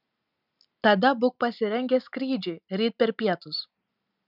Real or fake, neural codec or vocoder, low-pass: real; none; 5.4 kHz